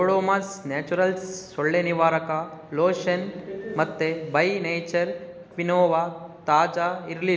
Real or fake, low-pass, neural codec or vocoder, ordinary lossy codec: real; none; none; none